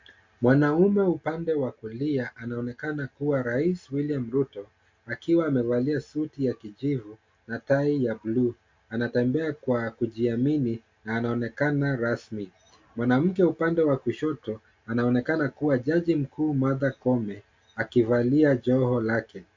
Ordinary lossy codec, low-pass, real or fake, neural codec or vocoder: MP3, 48 kbps; 7.2 kHz; real; none